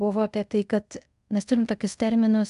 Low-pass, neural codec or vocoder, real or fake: 10.8 kHz; codec, 24 kHz, 0.5 kbps, DualCodec; fake